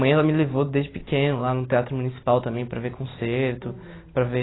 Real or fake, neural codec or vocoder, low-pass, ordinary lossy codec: real; none; 7.2 kHz; AAC, 16 kbps